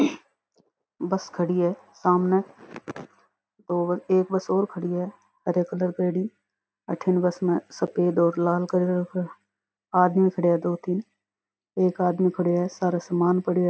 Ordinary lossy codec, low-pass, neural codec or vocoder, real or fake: none; none; none; real